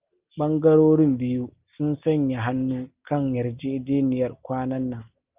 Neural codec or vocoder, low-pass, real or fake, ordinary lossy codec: none; 3.6 kHz; real; Opus, 24 kbps